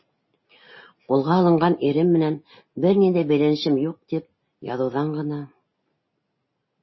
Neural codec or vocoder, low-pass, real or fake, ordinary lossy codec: none; 7.2 kHz; real; MP3, 24 kbps